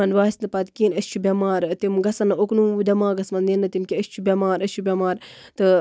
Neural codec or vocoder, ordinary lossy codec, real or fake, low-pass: none; none; real; none